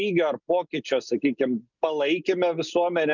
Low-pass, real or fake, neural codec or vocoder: 7.2 kHz; real; none